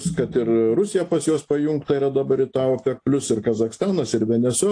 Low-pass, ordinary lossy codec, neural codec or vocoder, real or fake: 9.9 kHz; AAC, 48 kbps; none; real